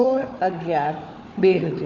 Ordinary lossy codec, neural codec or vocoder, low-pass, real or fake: none; codec, 16 kHz, 4 kbps, FunCodec, trained on Chinese and English, 50 frames a second; 7.2 kHz; fake